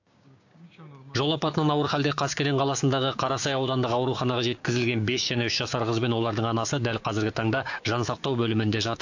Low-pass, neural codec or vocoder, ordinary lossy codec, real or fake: 7.2 kHz; codec, 16 kHz, 6 kbps, DAC; AAC, 48 kbps; fake